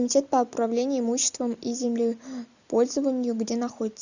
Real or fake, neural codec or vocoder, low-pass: real; none; 7.2 kHz